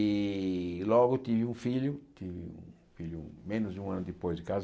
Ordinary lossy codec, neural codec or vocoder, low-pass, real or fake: none; none; none; real